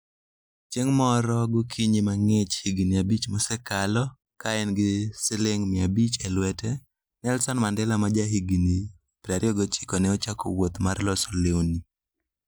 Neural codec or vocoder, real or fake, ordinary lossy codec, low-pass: none; real; none; none